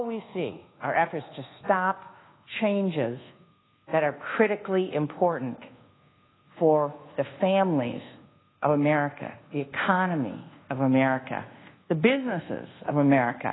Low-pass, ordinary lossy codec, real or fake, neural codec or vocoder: 7.2 kHz; AAC, 16 kbps; fake; codec, 24 kHz, 1.2 kbps, DualCodec